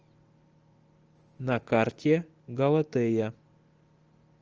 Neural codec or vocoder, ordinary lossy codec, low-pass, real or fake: none; Opus, 24 kbps; 7.2 kHz; real